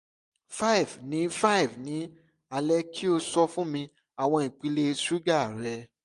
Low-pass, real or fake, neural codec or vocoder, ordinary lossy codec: 10.8 kHz; real; none; MP3, 64 kbps